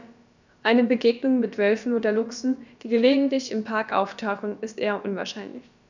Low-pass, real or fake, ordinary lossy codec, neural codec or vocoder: 7.2 kHz; fake; none; codec, 16 kHz, about 1 kbps, DyCAST, with the encoder's durations